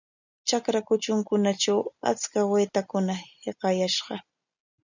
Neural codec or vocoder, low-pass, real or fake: none; 7.2 kHz; real